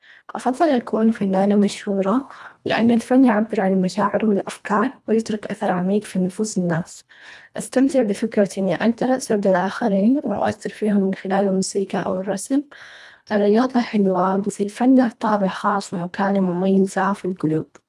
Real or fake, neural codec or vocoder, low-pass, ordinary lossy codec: fake; codec, 24 kHz, 1.5 kbps, HILCodec; none; none